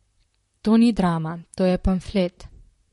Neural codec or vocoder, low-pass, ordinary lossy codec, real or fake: vocoder, 44.1 kHz, 128 mel bands, Pupu-Vocoder; 19.8 kHz; MP3, 48 kbps; fake